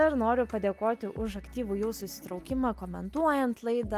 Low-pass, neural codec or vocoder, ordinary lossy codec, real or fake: 14.4 kHz; none; Opus, 32 kbps; real